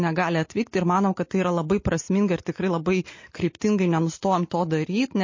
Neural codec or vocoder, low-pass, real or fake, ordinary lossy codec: none; 7.2 kHz; real; MP3, 32 kbps